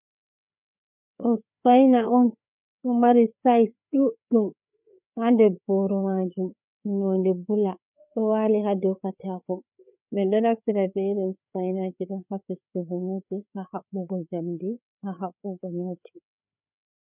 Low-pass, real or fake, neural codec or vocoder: 3.6 kHz; fake; codec, 16 kHz, 4 kbps, FreqCodec, larger model